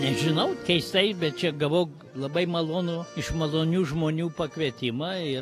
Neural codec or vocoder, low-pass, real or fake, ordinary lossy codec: none; 14.4 kHz; real; AAC, 48 kbps